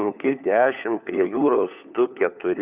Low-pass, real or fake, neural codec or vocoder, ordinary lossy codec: 3.6 kHz; fake; codec, 16 kHz, 4 kbps, FunCodec, trained on LibriTTS, 50 frames a second; Opus, 64 kbps